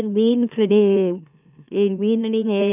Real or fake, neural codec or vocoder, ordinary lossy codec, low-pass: fake; autoencoder, 44.1 kHz, a latent of 192 numbers a frame, MeloTTS; none; 3.6 kHz